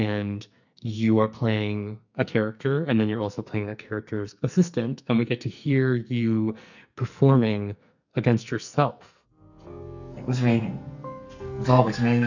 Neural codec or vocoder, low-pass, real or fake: codec, 44.1 kHz, 2.6 kbps, SNAC; 7.2 kHz; fake